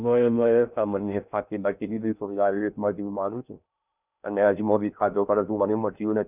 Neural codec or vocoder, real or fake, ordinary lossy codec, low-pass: codec, 16 kHz in and 24 kHz out, 0.6 kbps, FocalCodec, streaming, 2048 codes; fake; none; 3.6 kHz